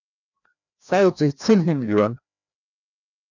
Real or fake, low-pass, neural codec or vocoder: fake; 7.2 kHz; codec, 16 kHz, 1 kbps, FreqCodec, larger model